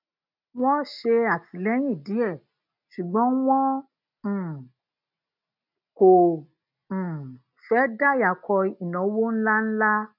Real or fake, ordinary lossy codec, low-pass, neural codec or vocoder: real; none; 5.4 kHz; none